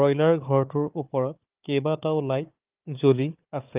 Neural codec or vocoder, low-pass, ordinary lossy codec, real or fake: codec, 16 kHz, 4 kbps, FunCodec, trained on Chinese and English, 50 frames a second; 3.6 kHz; Opus, 32 kbps; fake